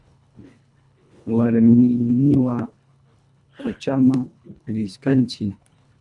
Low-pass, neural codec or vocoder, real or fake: 10.8 kHz; codec, 24 kHz, 1.5 kbps, HILCodec; fake